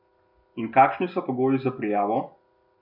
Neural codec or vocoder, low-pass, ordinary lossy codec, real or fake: none; 5.4 kHz; none; real